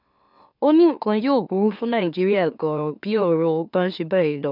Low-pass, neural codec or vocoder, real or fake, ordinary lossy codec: 5.4 kHz; autoencoder, 44.1 kHz, a latent of 192 numbers a frame, MeloTTS; fake; none